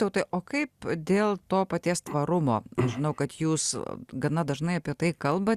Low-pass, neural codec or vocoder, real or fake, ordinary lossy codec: 14.4 kHz; none; real; Opus, 64 kbps